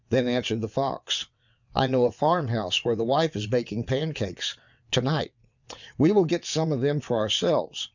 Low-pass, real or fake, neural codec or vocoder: 7.2 kHz; fake; vocoder, 22.05 kHz, 80 mel bands, WaveNeXt